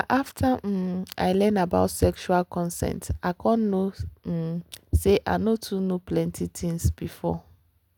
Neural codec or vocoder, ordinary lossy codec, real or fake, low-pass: none; none; real; 19.8 kHz